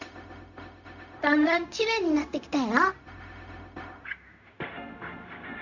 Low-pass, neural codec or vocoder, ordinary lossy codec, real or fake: 7.2 kHz; codec, 16 kHz, 0.4 kbps, LongCat-Audio-Codec; none; fake